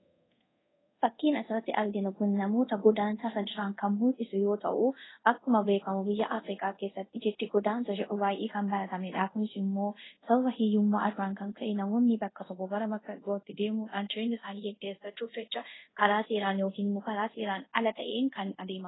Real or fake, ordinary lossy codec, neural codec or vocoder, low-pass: fake; AAC, 16 kbps; codec, 24 kHz, 0.5 kbps, DualCodec; 7.2 kHz